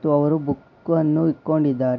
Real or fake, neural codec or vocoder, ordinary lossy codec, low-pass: real; none; none; 7.2 kHz